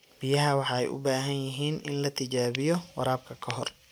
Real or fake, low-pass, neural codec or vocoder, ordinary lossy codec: real; none; none; none